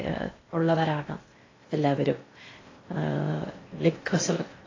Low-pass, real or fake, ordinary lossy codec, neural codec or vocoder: 7.2 kHz; fake; AAC, 32 kbps; codec, 16 kHz in and 24 kHz out, 0.6 kbps, FocalCodec, streaming, 2048 codes